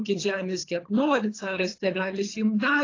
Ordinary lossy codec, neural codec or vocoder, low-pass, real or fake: AAC, 32 kbps; codec, 24 kHz, 3 kbps, HILCodec; 7.2 kHz; fake